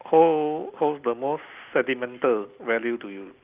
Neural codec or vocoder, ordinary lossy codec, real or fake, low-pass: none; Opus, 24 kbps; real; 3.6 kHz